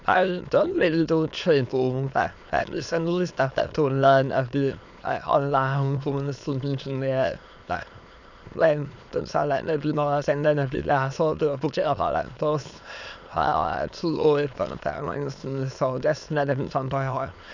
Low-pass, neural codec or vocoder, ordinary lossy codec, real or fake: 7.2 kHz; autoencoder, 22.05 kHz, a latent of 192 numbers a frame, VITS, trained on many speakers; none; fake